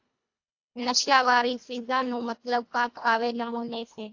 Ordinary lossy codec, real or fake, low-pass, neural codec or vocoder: AAC, 48 kbps; fake; 7.2 kHz; codec, 24 kHz, 1.5 kbps, HILCodec